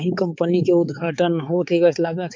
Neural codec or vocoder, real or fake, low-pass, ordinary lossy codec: codec, 16 kHz, 4 kbps, X-Codec, HuBERT features, trained on balanced general audio; fake; none; none